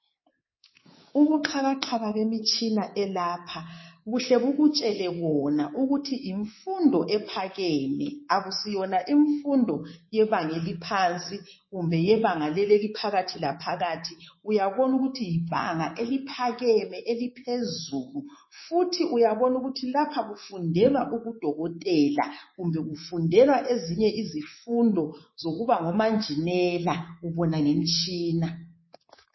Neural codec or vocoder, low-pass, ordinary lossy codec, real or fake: codec, 24 kHz, 3.1 kbps, DualCodec; 7.2 kHz; MP3, 24 kbps; fake